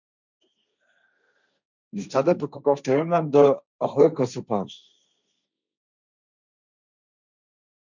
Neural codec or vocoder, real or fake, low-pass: codec, 16 kHz, 1.1 kbps, Voila-Tokenizer; fake; 7.2 kHz